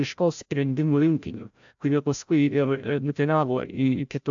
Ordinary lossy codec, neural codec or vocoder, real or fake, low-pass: AAC, 64 kbps; codec, 16 kHz, 0.5 kbps, FreqCodec, larger model; fake; 7.2 kHz